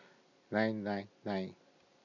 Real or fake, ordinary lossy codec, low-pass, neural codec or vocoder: real; none; 7.2 kHz; none